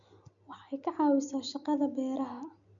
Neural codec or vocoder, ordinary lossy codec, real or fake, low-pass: none; none; real; 7.2 kHz